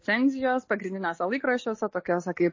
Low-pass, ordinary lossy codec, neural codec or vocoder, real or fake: 7.2 kHz; MP3, 32 kbps; none; real